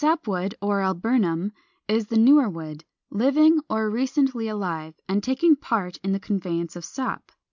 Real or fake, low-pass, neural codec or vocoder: real; 7.2 kHz; none